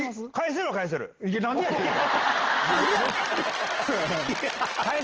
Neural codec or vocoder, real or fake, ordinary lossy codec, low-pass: none; real; Opus, 16 kbps; 7.2 kHz